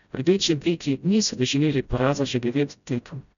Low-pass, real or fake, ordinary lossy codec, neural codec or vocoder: 7.2 kHz; fake; none; codec, 16 kHz, 0.5 kbps, FreqCodec, smaller model